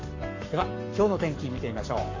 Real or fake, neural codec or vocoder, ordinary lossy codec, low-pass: fake; codec, 44.1 kHz, 7.8 kbps, Pupu-Codec; AAC, 32 kbps; 7.2 kHz